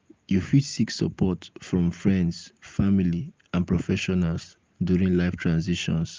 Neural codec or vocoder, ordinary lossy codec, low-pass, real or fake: none; Opus, 32 kbps; 7.2 kHz; real